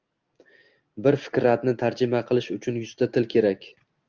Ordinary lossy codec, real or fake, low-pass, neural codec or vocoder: Opus, 32 kbps; real; 7.2 kHz; none